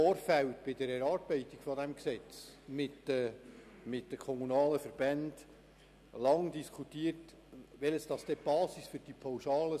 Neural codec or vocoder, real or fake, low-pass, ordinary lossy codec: none; real; 14.4 kHz; none